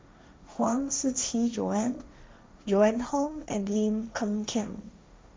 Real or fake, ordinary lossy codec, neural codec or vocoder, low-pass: fake; none; codec, 16 kHz, 1.1 kbps, Voila-Tokenizer; none